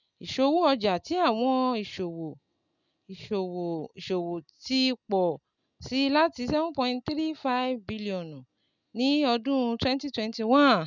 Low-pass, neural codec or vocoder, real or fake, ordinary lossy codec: 7.2 kHz; none; real; none